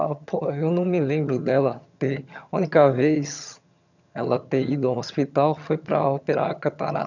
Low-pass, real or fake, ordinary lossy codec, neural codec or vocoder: 7.2 kHz; fake; none; vocoder, 22.05 kHz, 80 mel bands, HiFi-GAN